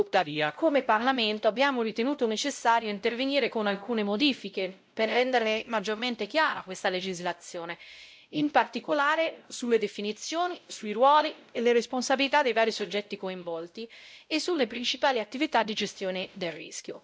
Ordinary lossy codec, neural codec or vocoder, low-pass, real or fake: none; codec, 16 kHz, 0.5 kbps, X-Codec, WavLM features, trained on Multilingual LibriSpeech; none; fake